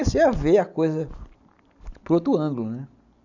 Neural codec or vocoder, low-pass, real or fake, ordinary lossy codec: none; 7.2 kHz; real; none